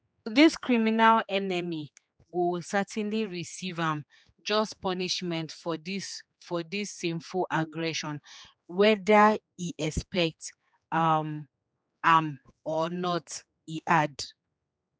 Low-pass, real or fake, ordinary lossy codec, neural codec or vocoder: none; fake; none; codec, 16 kHz, 4 kbps, X-Codec, HuBERT features, trained on general audio